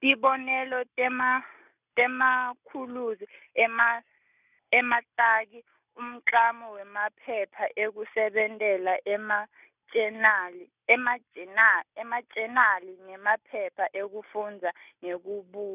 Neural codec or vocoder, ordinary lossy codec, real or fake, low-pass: none; none; real; 3.6 kHz